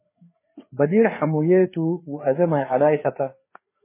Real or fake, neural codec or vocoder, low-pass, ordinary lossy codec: fake; codec, 16 kHz, 4 kbps, FreqCodec, larger model; 3.6 kHz; MP3, 16 kbps